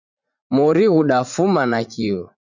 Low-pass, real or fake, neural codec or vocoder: 7.2 kHz; fake; vocoder, 44.1 kHz, 80 mel bands, Vocos